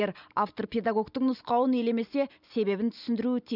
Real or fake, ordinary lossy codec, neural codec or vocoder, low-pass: real; none; none; 5.4 kHz